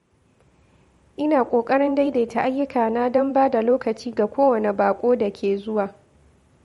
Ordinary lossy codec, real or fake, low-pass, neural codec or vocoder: MP3, 48 kbps; fake; 19.8 kHz; vocoder, 44.1 kHz, 128 mel bands every 512 samples, BigVGAN v2